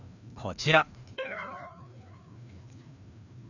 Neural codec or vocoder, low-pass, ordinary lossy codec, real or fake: codec, 16 kHz, 2 kbps, FreqCodec, larger model; 7.2 kHz; none; fake